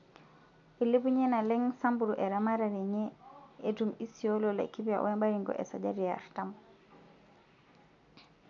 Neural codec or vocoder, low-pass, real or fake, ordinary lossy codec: none; 7.2 kHz; real; none